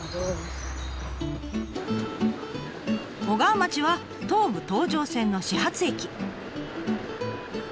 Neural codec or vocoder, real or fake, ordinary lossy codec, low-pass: none; real; none; none